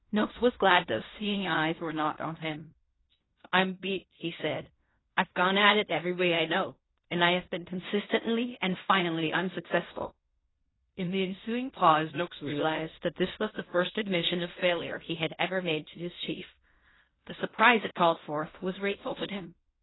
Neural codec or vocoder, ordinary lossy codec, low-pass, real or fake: codec, 16 kHz in and 24 kHz out, 0.4 kbps, LongCat-Audio-Codec, fine tuned four codebook decoder; AAC, 16 kbps; 7.2 kHz; fake